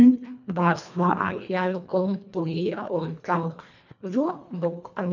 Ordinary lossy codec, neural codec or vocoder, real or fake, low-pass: none; codec, 24 kHz, 1.5 kbps, HILCodec; fake; 7.2 kHz